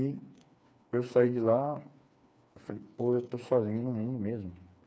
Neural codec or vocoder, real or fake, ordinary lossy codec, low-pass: codec, 16 kHz, 4 kbps, FreqCodec, smaller model; fake; none; none